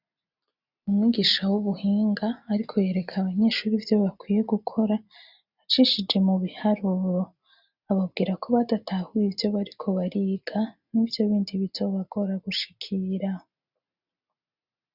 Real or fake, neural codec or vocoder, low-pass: real; none; 5.4 kHz